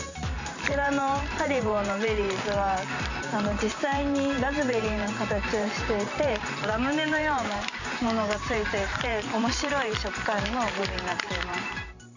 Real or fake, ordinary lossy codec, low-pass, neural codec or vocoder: fake; none; 7.2 kHz; vocoder, 44.1 kHz, 128 mel bands every 512 samples, BigVGAN v2